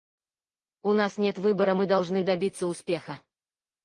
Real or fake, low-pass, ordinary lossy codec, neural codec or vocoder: real; 9.9 kHz; Opus, 24 kbps; none